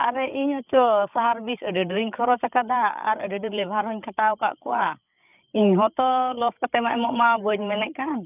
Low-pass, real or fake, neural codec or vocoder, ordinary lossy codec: 3.6 kHz; fake; codec, 16 kHz, 8 kbps, FreqCodec, larger model; none